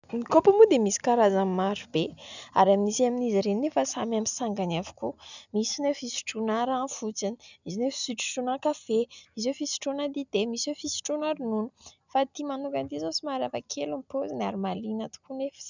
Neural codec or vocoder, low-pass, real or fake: none; 7.2 kHz; real